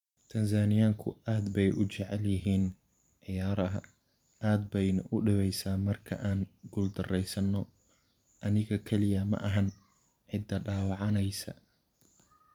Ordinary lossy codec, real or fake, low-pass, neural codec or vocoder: none; fake; 19.8 kHz; vocoder, 44.1 kHz, 128 mel bands every 512 samples, BigVGAN v2